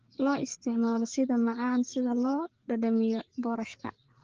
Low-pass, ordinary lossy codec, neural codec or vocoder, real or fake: 7.2 kHz; Opus, 16 kbps; codec, 16 kHz, 4 kbps, FreqCodec, larger model; fake